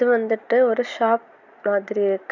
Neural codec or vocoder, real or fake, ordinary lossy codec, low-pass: none; real; none; 7.2 kHz